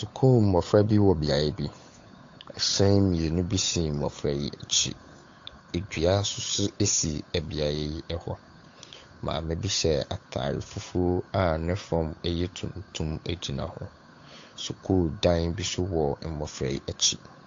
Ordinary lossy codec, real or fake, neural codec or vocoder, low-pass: AAC, 48 kbps; fake; codec, 16 kHz, 8 kbps, FunCodec, trained on Chinese and English, 25 frames a second; 7.2 kHz